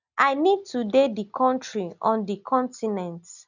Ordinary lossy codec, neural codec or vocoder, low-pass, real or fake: none; none; 7.2 kHz; real